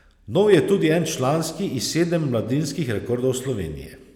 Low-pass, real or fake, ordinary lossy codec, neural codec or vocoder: 19.8 kHz; real; none; none